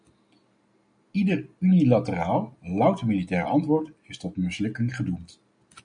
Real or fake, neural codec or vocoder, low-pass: real; none; 9.9 kHz